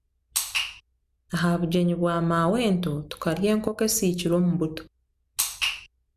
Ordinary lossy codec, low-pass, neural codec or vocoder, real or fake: MP3, 96 kbps; 14.4 kHz; none; real